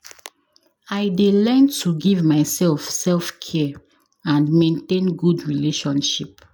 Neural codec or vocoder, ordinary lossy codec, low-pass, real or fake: none; none; none; real